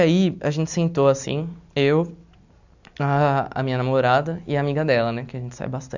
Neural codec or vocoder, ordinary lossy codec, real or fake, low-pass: none; none; real; 7.2 kHz